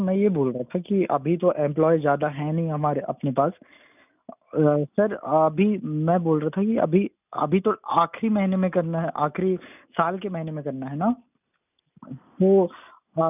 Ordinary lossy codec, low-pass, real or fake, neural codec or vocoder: none; 3.6 kHz; real; none